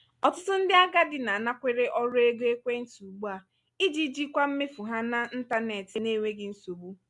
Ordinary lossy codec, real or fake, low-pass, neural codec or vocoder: MP3, 96 kbps; real; 10.8 kHz; none